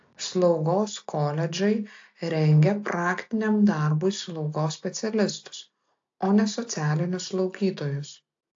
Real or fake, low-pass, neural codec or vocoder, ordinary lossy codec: real; 7.2 kHz; none; AAC, 48 kbps